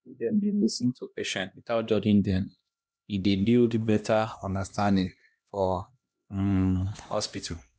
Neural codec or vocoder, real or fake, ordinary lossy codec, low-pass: codec, 16 kHz, 1 kbps, X-Codec, HuBERT features, trained on LibriSpeech; fake; none; none